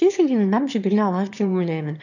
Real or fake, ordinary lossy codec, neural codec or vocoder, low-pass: fake; none; autoencoder, 22.05 kHz, a latent of 192 numbers a frame, VITS, trained on one speaker; 7.2 kHz